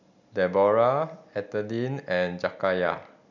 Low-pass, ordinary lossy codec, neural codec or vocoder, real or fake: 7.2 kHz; none; none; real